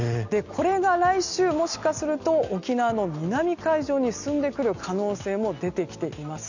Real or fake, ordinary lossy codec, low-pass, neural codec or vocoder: real; none; 7.2 kHz; none